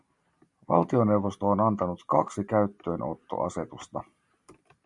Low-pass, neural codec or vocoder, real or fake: 10.8 kHz; none; real